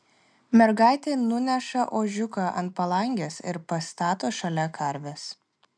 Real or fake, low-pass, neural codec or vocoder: real; 9.9 kHz; none